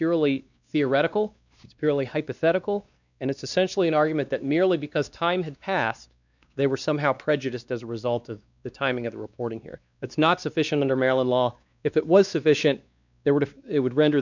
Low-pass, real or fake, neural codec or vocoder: 7.2 kHz; fake; codec, 16 kHz, 2 kbps, X-Codec, WavLM features, trained on Multilingual LibriSpeech